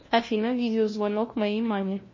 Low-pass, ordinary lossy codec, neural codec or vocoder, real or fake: 7.2 kHz; MP3, 32 kbps; codec, 16 kHz, 1 kbps, FunCodec, trained on LibriTTS, 50 frames a second; fake